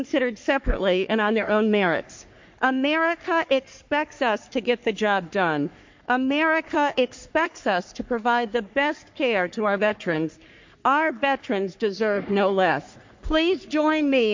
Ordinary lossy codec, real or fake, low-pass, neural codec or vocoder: MP3, 48 kbps; fake; 7.2 kHz; codec, 44.1 kHz, 3.4 kbps, Pupu-Codec